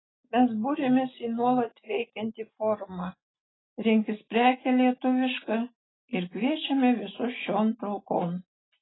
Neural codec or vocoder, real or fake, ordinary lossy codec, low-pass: none; real; AAC, 16 kbps; 7.2 kHz